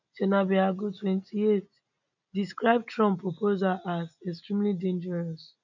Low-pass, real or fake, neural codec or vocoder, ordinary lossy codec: 7.2 kHz; real; none; none